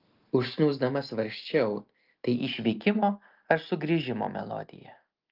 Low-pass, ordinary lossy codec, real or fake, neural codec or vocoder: 5.4 kHz; Opus, 32 kbps; real; none